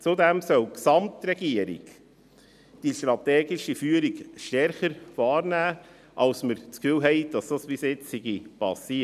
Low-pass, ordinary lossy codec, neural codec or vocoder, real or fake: 14.4 kHz; none; none; real